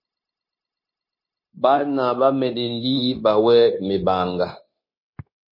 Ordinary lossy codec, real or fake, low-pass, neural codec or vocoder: MP3, 24 kbps; fake; 5.4 kHz; codec, 16 kHz, 0.9 kbps, LongCat-Audio-Codec